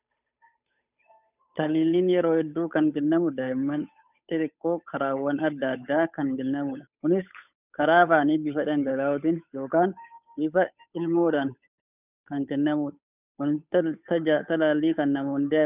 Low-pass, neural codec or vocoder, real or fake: 3.6 kHz; codec, 16 kHz, 8 kbps, FunCodec, trained on Chinese and English, 25 frames a second; fake